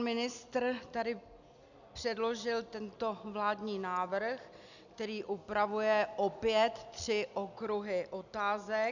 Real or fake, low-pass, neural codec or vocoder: real; 7.2 kHz; none